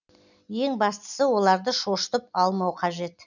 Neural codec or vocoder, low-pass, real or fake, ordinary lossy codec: none; 7.2 kHz; real; none